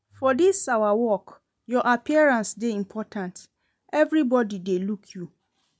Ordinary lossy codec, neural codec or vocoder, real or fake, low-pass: none; none; real; none